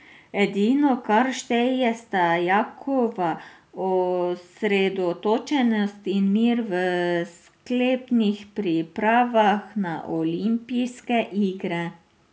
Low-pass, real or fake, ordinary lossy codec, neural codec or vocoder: none; real; none; none